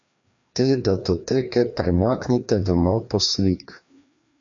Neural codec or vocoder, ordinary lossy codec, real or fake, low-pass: codec, 16 kHz, 2 kbps, FreqCodec, larger model; AAC, 64 kbps; fake; 7.2 kHz